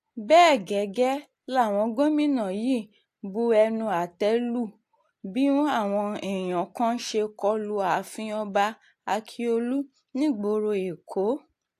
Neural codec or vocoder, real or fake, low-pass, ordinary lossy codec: none; real; 14.4 kHz; AAC, 64 kbps